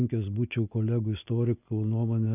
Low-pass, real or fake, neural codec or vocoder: 3.6 kHz; real; none